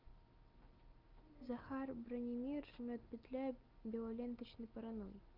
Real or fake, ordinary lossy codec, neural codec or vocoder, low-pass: real; Opus, 24 kbps; none; 5.4 kHz